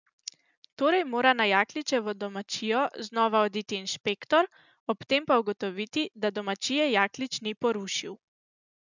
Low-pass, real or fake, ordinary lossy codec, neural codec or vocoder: 7.2 kHz; real; none; none